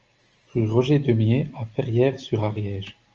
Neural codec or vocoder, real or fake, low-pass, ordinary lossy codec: none; real; 7.2 kHz; Opus, 24 kbps